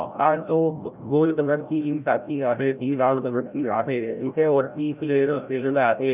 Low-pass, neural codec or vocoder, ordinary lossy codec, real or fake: 3.6 kHz; codec, 16 kHz, 0.5 kbps, FreqCodec, larger model; none; fake